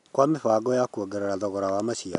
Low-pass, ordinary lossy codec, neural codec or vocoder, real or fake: 10.8 kHz; none; none; real